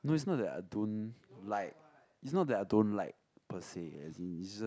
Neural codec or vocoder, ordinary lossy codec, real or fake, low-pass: none; none; real; none